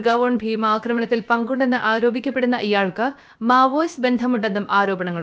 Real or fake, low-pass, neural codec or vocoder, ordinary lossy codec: fake; none; codec, 16 kHz, about 1 kbps, DyCAST, with the encoder's durations; none